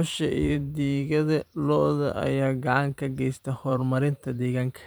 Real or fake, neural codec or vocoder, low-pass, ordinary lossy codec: fake; vocoder, 44.1 kHz, 128 mel bands every 512 samples, BigVGAN v2; none; none